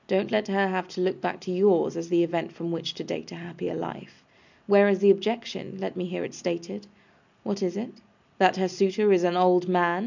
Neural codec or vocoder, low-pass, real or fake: none; 7.2 kHz; real